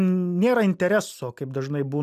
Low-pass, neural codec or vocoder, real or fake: 14.4 kHz; none; real